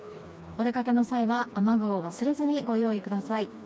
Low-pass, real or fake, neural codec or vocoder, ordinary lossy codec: none; fake; codec, 16 kHz, 2 kbps, FreqCodec, smaller model; none